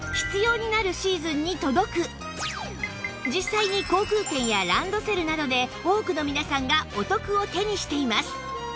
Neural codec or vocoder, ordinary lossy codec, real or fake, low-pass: none; none; real; none